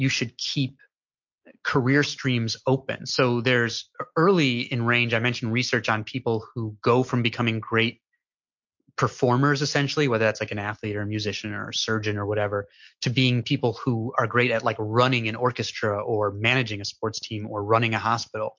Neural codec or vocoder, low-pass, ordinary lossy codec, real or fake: none; 7.2 kHz; MP3, 48 kbps; real